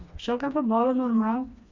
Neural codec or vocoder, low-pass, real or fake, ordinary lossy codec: codec, 16 kHz, 2 kbps, FreqCodec, smaller model; 7.2 kHz; fake; MP3, 64 kbps